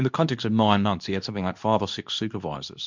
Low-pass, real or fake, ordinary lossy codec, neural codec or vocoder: 7.2 kHz; fake; MP3, 64 kbps; codec, 24 kHz, 0.9 kbps, WavTokenizer, medium speech release version 2